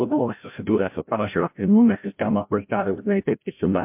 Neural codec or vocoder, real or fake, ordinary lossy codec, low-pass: codec, 16 kHz, 0.5 kbps, FreqCodec, larger model; fake; MP3, 32 kbps; 3.6 kHz